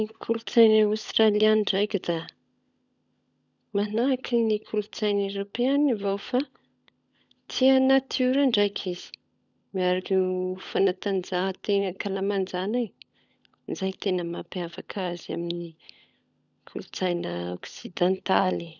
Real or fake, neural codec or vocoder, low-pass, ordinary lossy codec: fake; codec, 16 kHz, 16 kbps, FunCodec, trained on LibriTTS, 50 frames a second; 7.2 kHz; none